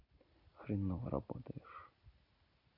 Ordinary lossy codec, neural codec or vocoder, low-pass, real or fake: AAC, 32 kbps; none; 5.4 kHz; real